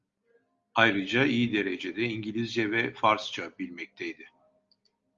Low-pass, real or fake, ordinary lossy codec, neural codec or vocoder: 7.2 kHz; real; Opus, 32 kbps; none